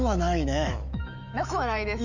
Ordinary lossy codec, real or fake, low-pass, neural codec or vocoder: none; fake; 7.2 kHz; autoencoder, 48 kHz, 128 numbers a frame, DAC-VAE, trained on Japanese speech